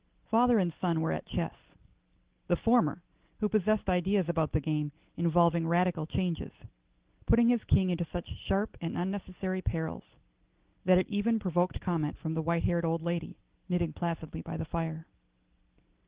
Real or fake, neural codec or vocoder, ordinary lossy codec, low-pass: real; none; Opus, 16 kbps; 3.6 kHz